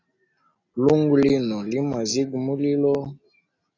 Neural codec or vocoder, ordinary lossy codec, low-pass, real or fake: none; AAC, 32 kbps; 7.2 kHz; real